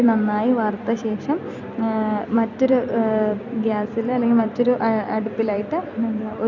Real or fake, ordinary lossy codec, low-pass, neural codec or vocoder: fake; none; 7.2 kHz; vocoder, 44.1 kHz, 128 mel bands every 256 samples, BigVGAN v2